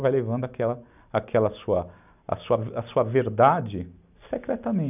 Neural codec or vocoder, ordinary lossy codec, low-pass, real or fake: none; none; 3.6 kHz; real